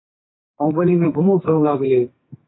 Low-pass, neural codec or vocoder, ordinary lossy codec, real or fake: 7.2 kHz; codec, 44.1 kHz, 2.6 kbps, SNAC; AAC, 16 kbps; fake